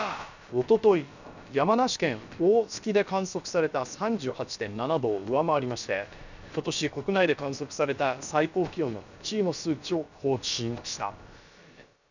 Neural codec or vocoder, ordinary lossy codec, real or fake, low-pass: codec, 16 kHz, about 1 kbps, DyCAST, with the encoder's durations; none; fake; 7.2 kHz